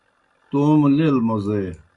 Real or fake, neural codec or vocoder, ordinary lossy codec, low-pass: real; none; Opus, 64 kbps; 10.8 kHz